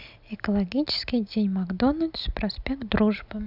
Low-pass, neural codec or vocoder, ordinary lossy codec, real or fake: 5.4 kHz; none; none; real